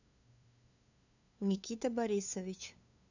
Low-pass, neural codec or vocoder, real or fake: 7.2 kHz; codec, 16 kHz, 2 kbps, FunCodec, trained on LibriTTS, 25 frames a second; fake